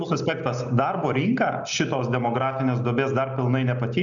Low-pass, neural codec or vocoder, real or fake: 7.2 kHz; none; real